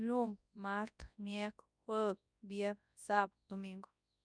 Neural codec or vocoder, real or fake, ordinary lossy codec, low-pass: codec, 24 kHz, 0.9 kbps, WavTokenizer, large speech release; fake; none; 9.9 kHz